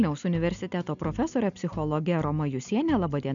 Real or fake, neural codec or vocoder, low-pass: real; none; 7.2 kHz